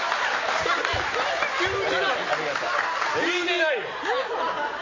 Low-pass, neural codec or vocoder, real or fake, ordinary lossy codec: 7.2 kHz; none; real; MP3, 32 kbps